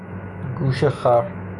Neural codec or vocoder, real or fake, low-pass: autoencoder, 48 kHz, 128 numbers a frame, DAC-VAE, trained on Japanese speech; fake; 10.8 kHz